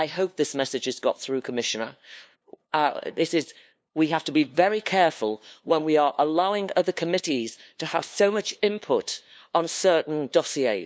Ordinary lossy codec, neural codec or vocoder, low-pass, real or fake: none; codec, 16 kHz, 2 kbps, FunCodec, trained on LibriTTS, 25 frames a second; none; fake